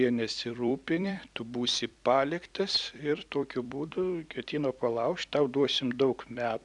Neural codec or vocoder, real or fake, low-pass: vocoder, 44.1 kHz, 128 mel bands every 256 samples, BigVGAN v2; fake; 10.8 kHz